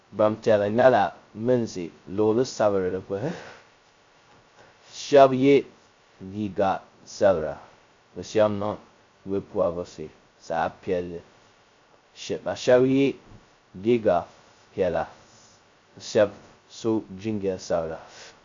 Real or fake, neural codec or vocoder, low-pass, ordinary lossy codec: fake; codec, 16 kHz, 0.2 kbps, FocalCodec; 7.2 kHz; MP3, 48 kbps